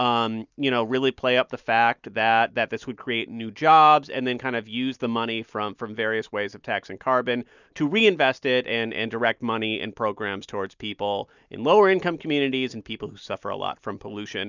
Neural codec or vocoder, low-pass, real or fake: none; 7.2 kHz; real